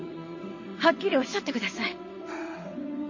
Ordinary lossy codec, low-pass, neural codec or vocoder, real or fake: MP3, 32 kbps; 7.2 kHz; vocoder, 22.05 kHz, 80 mel bands, WaveNeXt; fake